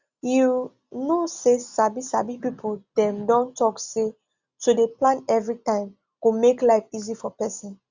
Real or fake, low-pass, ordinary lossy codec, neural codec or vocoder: real; 7.2 kHz; Opus, 64 kbps; none